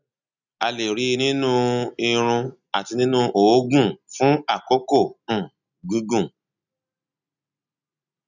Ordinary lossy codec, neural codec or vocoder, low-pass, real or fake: none; none; 7.2 kHz; real